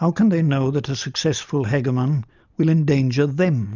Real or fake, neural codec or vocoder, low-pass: real; none; 7.2 kHz